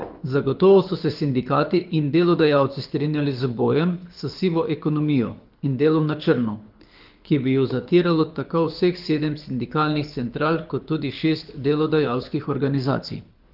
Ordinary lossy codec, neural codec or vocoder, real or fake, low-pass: Opus, 32 kbps; codec, 24 kHz, 6 kbps, HILCodec; fake; 5.4 kHz